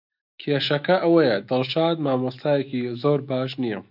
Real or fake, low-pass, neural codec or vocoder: fake; 5.4 kHz; autoencoder, 48 kHz, 128 numbers a frame, DAC-VAE, trained on Japanese speech